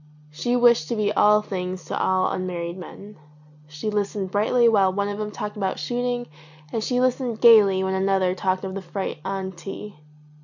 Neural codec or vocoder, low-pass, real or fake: none; 7.2 kHz; real